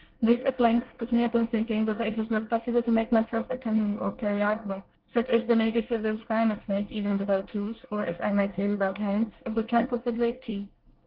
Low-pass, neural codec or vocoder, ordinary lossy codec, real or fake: 5.4 kHz; codec, 24 kHz, 1 kbps, SNAC; Opus, 16 kbps; fake